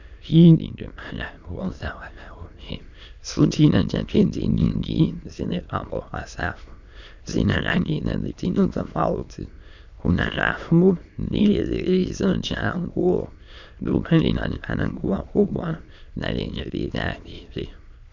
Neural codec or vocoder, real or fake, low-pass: autoencoder, 22.05 kHz, a latent of 192 numbers a frame, VITS, trained on many speakers; fake; 7.2 kHz